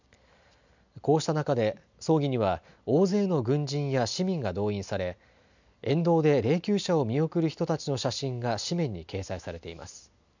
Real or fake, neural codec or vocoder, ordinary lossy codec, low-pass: real; none; none; 7.2 kHz